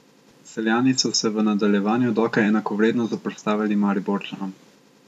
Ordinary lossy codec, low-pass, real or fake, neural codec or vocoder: none; 14.4 kHz; real; none